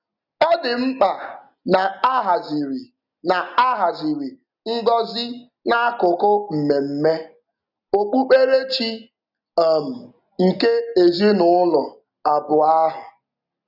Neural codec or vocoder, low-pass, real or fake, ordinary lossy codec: none; 5.4 kHz; real; none